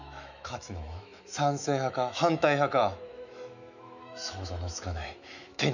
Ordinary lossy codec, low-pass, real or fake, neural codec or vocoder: none; 7.2 kHz; fake; autoencoder, 48 kHz, 128 numbers a frame, DAC-VAE, trained on Japanese speech